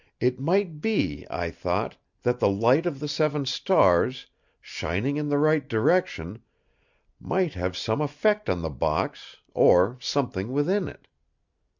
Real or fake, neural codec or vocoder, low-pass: real; none; 7.2 kHz